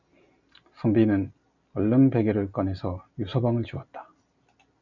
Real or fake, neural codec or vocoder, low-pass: real; none; 7.2 kHz